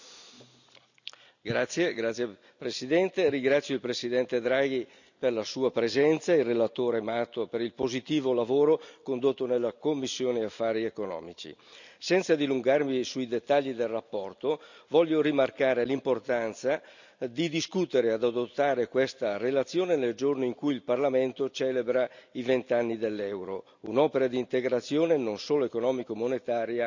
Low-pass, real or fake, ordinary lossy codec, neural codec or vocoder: 7.2 kHz; real; none; none